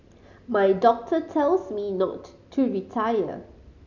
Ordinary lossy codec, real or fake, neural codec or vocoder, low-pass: none; real; none; 7.2 kHz